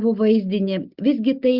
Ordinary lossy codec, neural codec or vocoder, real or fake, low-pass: Opus, 64 kbps; none; real; 5.4 kHz